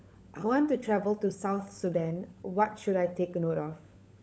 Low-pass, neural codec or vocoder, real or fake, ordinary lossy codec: none; codec, 16 kHz, 16 kbps, FunCodec, trained on LibriTTS, 50 frames a second; fake; none